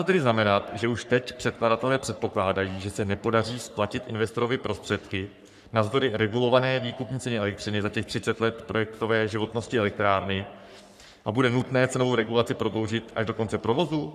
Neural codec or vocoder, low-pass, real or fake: codec, 44.1 kHz, 3.4 kbps, Pupu-Codec; 14.4 kHz; fake